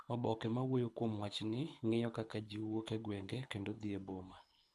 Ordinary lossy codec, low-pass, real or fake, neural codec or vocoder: none; none; fake; codec, 24 kHz, 6 kbps, HILCodec